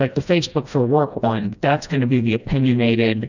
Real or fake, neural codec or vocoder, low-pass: fake; codec, 16 kHz, 1 kbps, FreqCodec, smaller model; 7.2 kHz